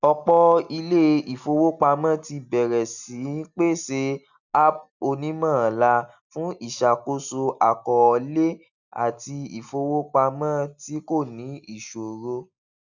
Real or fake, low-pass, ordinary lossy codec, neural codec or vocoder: real; 7.2 kHz; none; none